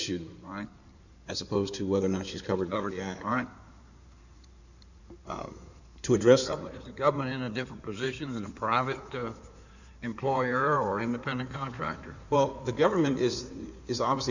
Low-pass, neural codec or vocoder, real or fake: 7.2 kHz; codec, 16 kHz in and 24 kHz out, 2.2 kbps, FireRedTTS-2 codec; fake